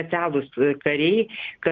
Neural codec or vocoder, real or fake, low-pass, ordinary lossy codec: none; real; 7.2 kHz; Opus, 32 kbps